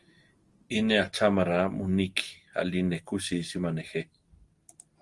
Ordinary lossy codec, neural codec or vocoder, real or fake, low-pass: Opus, 24 kbps; none; real; 10.8 kHz